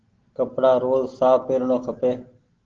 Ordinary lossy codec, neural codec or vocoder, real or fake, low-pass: Opus, 16 kbps; none; real; 7.2 kHz